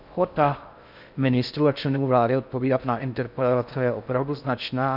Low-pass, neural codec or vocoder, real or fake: 5.4 kHz; codec, 16 kHz in and 24 kHz out, 0.6 kbps, FocalCodec, streaming, 4096 codes; fake